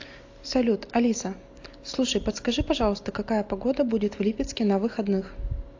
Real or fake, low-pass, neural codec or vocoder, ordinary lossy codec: real; 7.2 kHz; none; AAC, 48 kbps